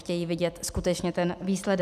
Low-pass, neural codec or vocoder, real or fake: 14.4 kHz; none; real